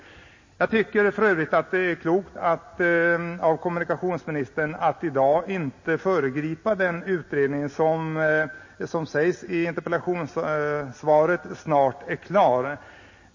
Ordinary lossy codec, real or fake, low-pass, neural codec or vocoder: MP3, 32 kbps; real; 7.2 kHz; none